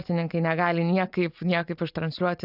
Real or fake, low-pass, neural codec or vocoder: real; 5.4 kHz; none